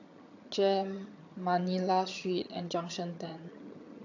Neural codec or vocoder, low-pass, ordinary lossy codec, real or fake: vocoder, 22.05 kHz, 80 mel bands, HiFi-GAN; 7.2 kHz; AAC, 48 kbps; fake